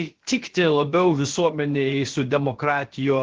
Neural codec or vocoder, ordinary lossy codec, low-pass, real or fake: codec, 16 kHz, about 1 kbps, DyCAST, with the encoder's durations; Opus, 16 kbps; 7.2 kHz; fake